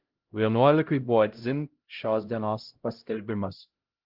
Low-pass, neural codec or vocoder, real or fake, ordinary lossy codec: 5.4 kHz; codec, 16 kHz, 0.5 kbps, X-Codec, HuBERT features, trained on LibriSpeech; fake; Opus, 16 kbps